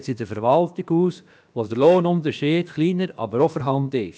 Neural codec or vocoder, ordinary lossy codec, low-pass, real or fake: codec, 16 kHz, about 1 kbps, DyCAST, with the encoder's durations; none; none; fake